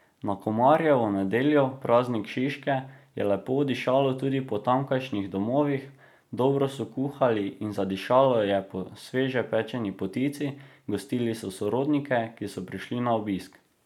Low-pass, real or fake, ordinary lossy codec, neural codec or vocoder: 19.8 kHz; real; none; none